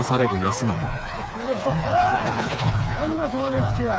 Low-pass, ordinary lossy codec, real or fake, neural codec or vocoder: none; none; fake; codec, 16 kHz, 4 kbps, FreqCodec, smaller model